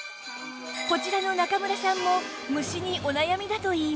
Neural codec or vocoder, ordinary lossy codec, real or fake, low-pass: none; none; real; none